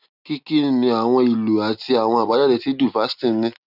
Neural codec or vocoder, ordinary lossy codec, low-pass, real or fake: none; none; 5.4 kHz; real